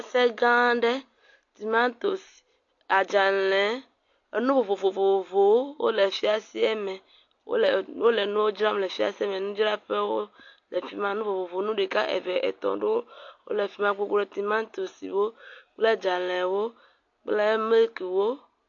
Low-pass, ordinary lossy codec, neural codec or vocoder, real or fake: 7.2 kHz; AAC, 48 kbps; none; real